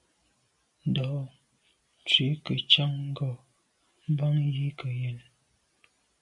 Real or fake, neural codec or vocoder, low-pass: real; none; 10.8 kHz